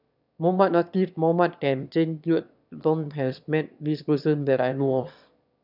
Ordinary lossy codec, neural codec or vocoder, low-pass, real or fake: none; autoencoder, 22.05 kHz, a latent of 192 numbers a frame, VITS, trained on one speaker; 5.4 kHz; fake